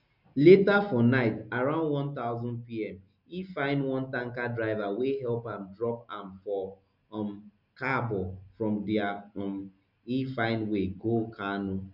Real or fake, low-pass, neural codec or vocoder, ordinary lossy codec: real; 5.4 kHz; none; none